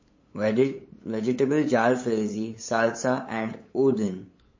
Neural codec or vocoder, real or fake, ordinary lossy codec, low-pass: codec, 16 kHz, 8 kbps, FunCodec, trained on LibriTTS, 25 frames a second; fake; MP3, 32 kbps; 7.2 kHz